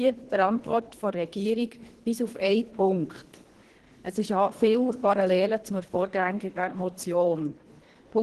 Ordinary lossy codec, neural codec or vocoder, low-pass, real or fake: Opus, 16 kbps; codec, 24 kHz, 1.5 kbps, HILCodec; 10.8 kHz; fake